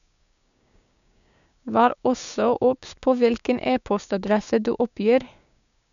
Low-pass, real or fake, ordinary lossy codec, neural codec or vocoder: 7.2 kHz; fake; none; codec, 16 kHz, 6 kbps, DAC